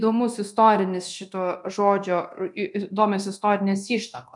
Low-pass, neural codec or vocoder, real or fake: 10.8 kHz; codec, 24 kHz, 0.9 kbps, DualCodec; fake